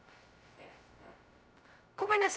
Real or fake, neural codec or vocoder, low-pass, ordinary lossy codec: fake; codec, 16 kHz, 0.2 kbps, FocalCodec; none; none